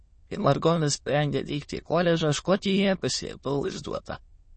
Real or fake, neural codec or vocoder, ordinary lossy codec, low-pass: fake; autoencoder, 22.05 kHz, a latent of 192 numbers a frame, VITS, trained on many speakers; MP3, 32 kbps; 9.9 kHz